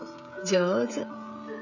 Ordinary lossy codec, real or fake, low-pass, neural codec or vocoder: none; fake; 7.2 kHz; codec, 16 kHz, 16 kbps, FreqCodec, smaller model